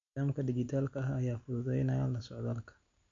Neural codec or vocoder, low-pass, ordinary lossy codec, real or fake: none; 7.2 kHz; MP3, 48 kbps; real